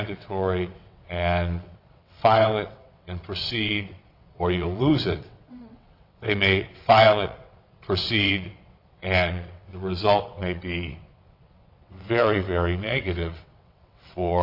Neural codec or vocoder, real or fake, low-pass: vocoder, 22.05 kHz, 80 mel bands, WaveNeXt; fake; 5.4 kHz